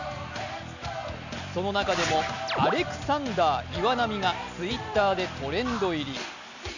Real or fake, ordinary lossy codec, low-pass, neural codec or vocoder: real; none; 7.2 kHz; none